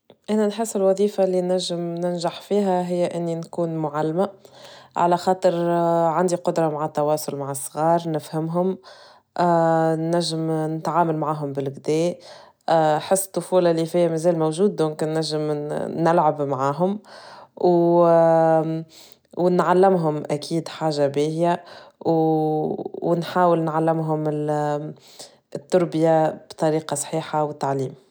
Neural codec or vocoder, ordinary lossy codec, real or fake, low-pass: none; none; real; none